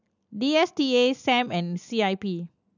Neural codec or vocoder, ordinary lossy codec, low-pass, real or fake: none; none; 7.2 kHz; real